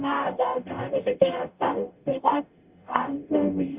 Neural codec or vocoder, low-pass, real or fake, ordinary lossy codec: codec, 44.1 kHz, 0.9 kbps, DAC; 3.6 kHz; fake; Opus, 64 kbps